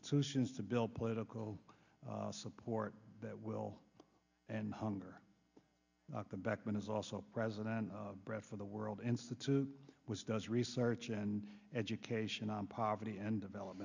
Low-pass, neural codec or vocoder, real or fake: 7.2 kHz; none; real